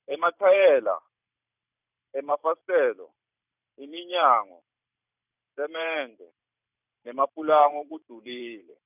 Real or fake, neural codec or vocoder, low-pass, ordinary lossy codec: fake; vocoder, 44.1 kHz, 128 mel bands every 512 samples, BigVGAN v2; 3.6 kHz; none